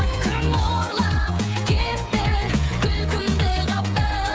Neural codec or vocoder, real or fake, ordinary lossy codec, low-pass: codec, 16 kHz, 8 kbps, FreqCodec, smaller model; fake; none; none